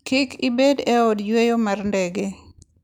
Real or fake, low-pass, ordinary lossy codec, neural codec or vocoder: real; 19.8 kHz; none; none